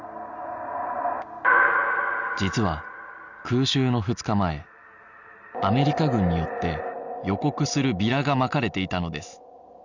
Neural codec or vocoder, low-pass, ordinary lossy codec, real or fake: none; 7.2 kHz; none; real